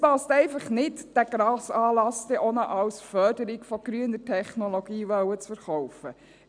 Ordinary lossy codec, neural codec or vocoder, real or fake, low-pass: none; none; real; 9.9 kHz